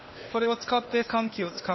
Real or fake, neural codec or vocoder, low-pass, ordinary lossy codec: fake; codec, 16 kHz, 0.8 kbps, ZipCodec; 7.2 kHz; MP3, 24 kbps